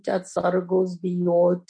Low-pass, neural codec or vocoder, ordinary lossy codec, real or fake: 9.9 kHz; none; MP3, 48 kbps; real